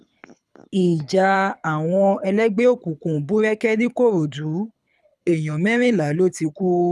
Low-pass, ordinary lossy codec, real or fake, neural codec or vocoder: none; none; fake; codec, 24 kHz, 6 kbps, HILCodec